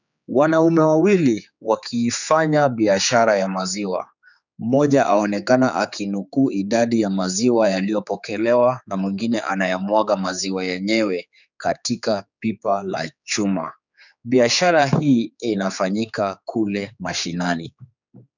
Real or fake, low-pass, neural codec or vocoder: fake; 7.2 kHz; codec, 16 kHz, 4 kbps, X-Codec, HuBERT features, trained on general audio